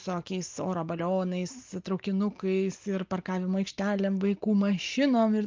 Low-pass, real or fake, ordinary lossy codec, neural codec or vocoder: 7.2 kHz; real; Opus, 32 kbps; none